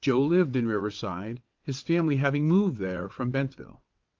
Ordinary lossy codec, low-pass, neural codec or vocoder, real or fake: Opus, 32 kbps; 7.2 kHz; vocoder, 22.05 kHz, 80 mel bands, WaveNeXt; fake